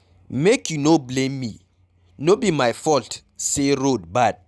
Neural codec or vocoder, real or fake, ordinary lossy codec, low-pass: none; real; none; none